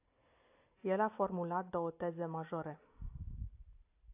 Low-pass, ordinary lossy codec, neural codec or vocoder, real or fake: 3.6 kHz; AAC, 24 kbps; none; real